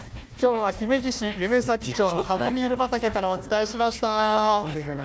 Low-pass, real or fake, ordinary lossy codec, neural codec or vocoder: none; fake; none; codec, 16 kHz, 1 kbps, FunCodec, trained on Chinese and English, 50 frames a second